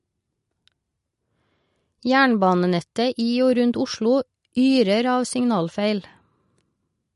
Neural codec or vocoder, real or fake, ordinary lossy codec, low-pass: none; real; MP3, 48 kbps; 14.4 kHz